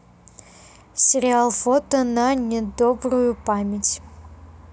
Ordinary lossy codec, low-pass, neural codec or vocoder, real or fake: none; none; none; real